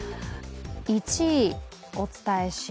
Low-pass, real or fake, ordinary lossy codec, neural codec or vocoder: none; real; none; none